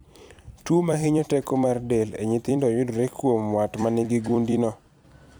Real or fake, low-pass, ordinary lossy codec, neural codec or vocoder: fake; none; none; vocoder, 44.1 kHz, 128 mel bands every 256 samples, BigVGAN v2